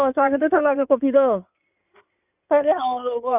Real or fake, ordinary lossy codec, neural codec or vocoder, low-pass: fake; none; codec, 16 kHz in and 24 kHz out, 2.2 kbps, FireRedTTS-2 codec; 3.6 kHz